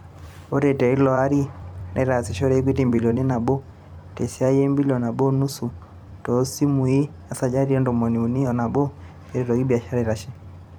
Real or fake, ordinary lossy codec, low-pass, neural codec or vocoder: fake; none; 19.8 kHz; vocoder, 44.1 kHz, 128 mel bands every 256 samples, BigVGAN v2